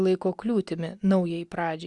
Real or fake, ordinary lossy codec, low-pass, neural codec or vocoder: real; Opus, 64 kbps; 10.8 kHz; none